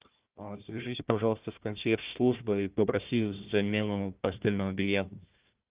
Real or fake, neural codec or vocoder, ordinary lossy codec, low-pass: fake; codec, 16 kHz, 1 kbps, FunCodec, trained on Chinese and English, 50 frames a second; Opus, 32 kbps; 3.6 kHz